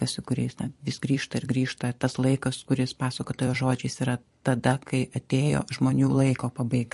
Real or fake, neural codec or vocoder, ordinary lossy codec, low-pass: fake; vocoder, 44.1 kHz, 128 mel bands every 256 samples, BigVGAN v2; MP3, 48 kbps; 14.4 kHz